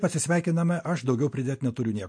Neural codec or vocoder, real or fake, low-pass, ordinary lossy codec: none; real; 9.9 kHz; MP3, 48 kbps